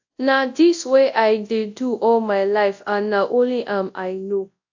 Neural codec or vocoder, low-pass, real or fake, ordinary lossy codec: codec, 24 kHz, 0.9 kbps, WavTokenizer, large speech release; 7.2 kHz; fake; AAC, 48 kbps